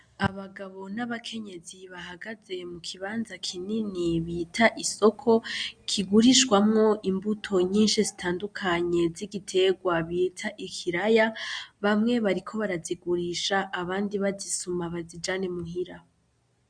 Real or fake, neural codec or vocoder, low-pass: real; none; 9.9 kHz